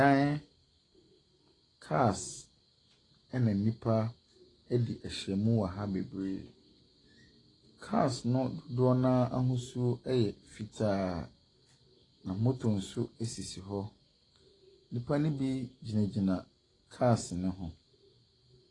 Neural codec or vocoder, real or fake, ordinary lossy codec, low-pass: none; real; AAC, 32 kbps; 10.8 kHz